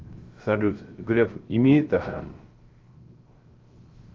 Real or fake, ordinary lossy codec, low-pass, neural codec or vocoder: fake; Opus, 32 kbps; 7.2 kHz; codec, 16 kHz, 0.3 kbps, FocalCodec